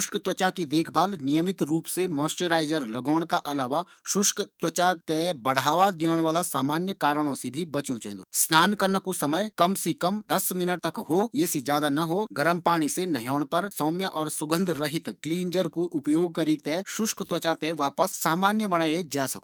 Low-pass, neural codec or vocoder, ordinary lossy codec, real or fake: none; codec, 44.1 kHz, 2.6 kbps, SNAC; none; fake